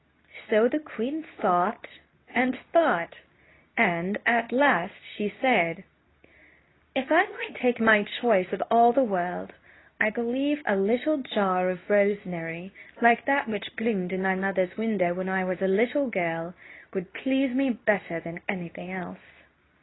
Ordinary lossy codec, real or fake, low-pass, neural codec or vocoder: AAC, 16 kbps; fake; 7.2 kHz; codec, 24 kHz, 0.9 kbps, WavTokenizer, medium speech release version 2